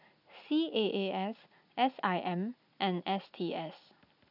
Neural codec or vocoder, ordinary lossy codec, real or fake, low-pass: none; none; real; 5.4 kHz